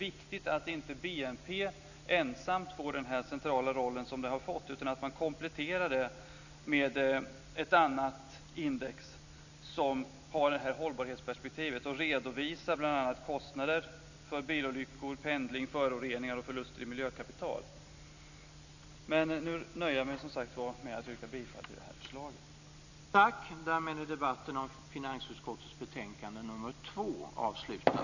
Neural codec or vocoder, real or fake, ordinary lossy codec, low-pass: none; real; none; 7.2 kHz